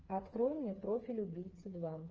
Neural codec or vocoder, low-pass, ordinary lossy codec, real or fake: codec, 16 kHz, 4 kbps, FreqCodec, smaller model; 7.2 kHz; Opus, 24 kbps; fake